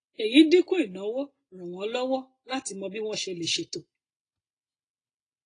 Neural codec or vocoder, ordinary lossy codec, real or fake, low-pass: none; AAC, 32 kbps; real; 9.9 kHz